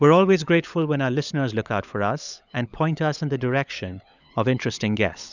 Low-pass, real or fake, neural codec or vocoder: 7.2 kHz; fake; codec, 16 kHz, 16 kbps, FunCodec, trained on LibriTTS, 50 frames a second